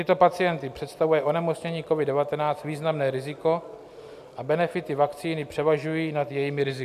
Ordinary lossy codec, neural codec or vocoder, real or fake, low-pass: AAC, 96 kbps; none; real; 14.4 kHz